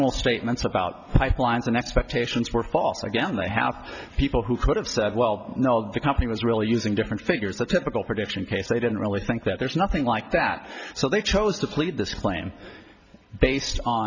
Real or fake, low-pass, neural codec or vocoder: real; 7.2 kHz; none